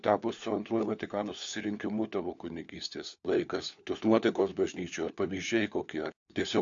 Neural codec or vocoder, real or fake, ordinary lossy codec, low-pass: codec, 16 kHz, 4 kbps, FunCodec, trained on LibriTTS, 50 frames a second; fake; AAC, 64 kbps; 7.2 kHz